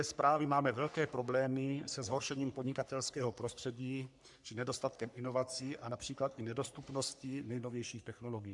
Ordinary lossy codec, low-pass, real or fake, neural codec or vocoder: MP3, 96 kbps; 10.8 kHz; fake; codec, 44.1 kHz, 3.4 kbps, Pupu-Codec